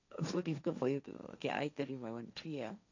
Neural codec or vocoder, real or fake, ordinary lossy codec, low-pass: codec, 16 kHz, 1.1 kbps, Voila-Tokenizer; fake; none; 7.2 kHz